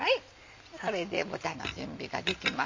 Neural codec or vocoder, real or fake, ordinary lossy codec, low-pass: none; real; MP3, 64 kbps; 7.2 kHz